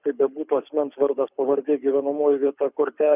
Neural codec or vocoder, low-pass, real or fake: codec, 24 kHz, 6 kbps, HILCodec; 3.6 kHz; fake